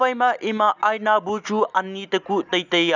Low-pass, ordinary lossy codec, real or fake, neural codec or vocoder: 7.2 kHz; none; real; none